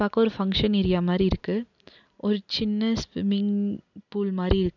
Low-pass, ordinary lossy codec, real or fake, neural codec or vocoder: 7.2 kHz; none; real; none